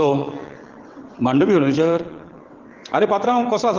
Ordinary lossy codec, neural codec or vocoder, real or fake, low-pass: Opus, 16 kbps; vocoder, 22.05 kHz, 80 mel bands, WaveNeXt; fake; 7.2 kHz